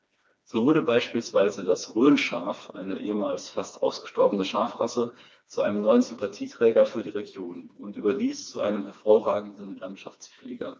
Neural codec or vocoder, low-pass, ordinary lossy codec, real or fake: codec, 16 kHz, 2 kbps, FreqCodec, smaller model; none; none; fake